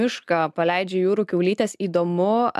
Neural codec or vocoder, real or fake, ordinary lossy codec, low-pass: none; real; MP3, 96 kbps; 14.4 kHz